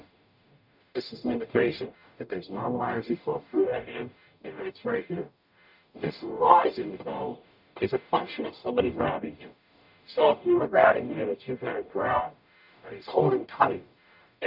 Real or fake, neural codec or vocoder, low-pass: fake; codec, 44.1 kHz, 0.9 kbps, DAC; 5.4 kHz